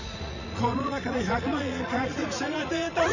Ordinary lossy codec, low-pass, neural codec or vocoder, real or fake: none; 7.2 kHz; vocoder, 44.1 kHz, 80 mel bands, Vocos; fake